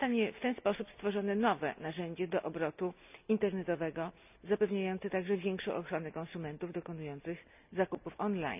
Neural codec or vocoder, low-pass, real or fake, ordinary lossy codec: none; 3.6 kHz; real; none